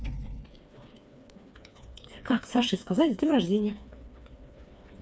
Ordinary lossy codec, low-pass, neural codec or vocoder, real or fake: none; none; codec, 16 kHz, 4 kbps, FreqCodec, smaller model; fake